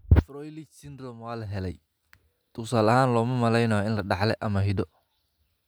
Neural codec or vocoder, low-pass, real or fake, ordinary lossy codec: none; none; real; none